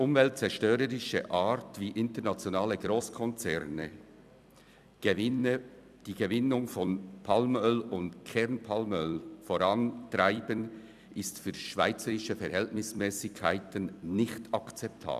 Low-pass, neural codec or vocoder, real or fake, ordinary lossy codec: 14.4 kHz; vocoder, 44.1 kHz, 128 mel bands every 256 samples, BigVGAN v2; fake; none